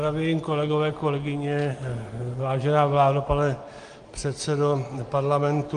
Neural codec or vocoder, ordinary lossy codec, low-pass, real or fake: none; Opus, 24 kbps; 9.9 kHz; real